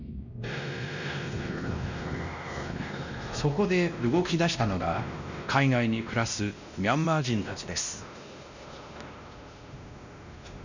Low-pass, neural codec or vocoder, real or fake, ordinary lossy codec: 7.2 kHz; codec, 16 kHz, 1 kbps, X-Codec, WavLM features, trained on Multilingual LibriSpeech; fake; none